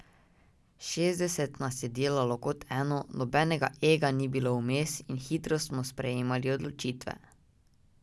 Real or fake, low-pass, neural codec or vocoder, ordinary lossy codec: real; none; none; none